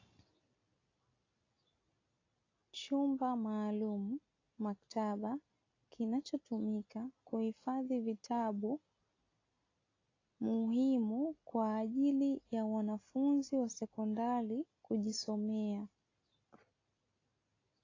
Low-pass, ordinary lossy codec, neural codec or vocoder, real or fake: 7.2 kHz; AAC, 32 kbps; none; real